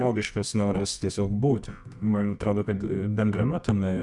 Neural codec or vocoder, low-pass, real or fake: codec, 24 kHz, 0.9 kbps, WavTokenizer, medium music audio release; 10.8 kHz; fake